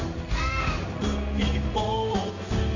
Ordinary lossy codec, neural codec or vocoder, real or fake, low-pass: none; codec, 16 kHz in and 24 kHz out, 1 kbps, XY-Tokenizer; fake; 7.2 kHz